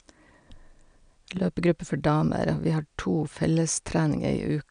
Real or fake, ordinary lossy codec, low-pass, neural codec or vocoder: real; none; 9.9 kHz; none